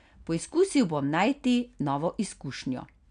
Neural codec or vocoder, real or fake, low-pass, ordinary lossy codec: none; real; 9.9 kHz; none